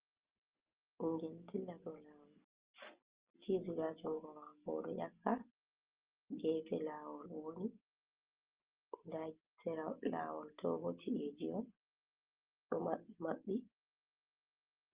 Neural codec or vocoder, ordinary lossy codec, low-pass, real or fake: none; Opus, 24 kbps; 3.6 kHz; real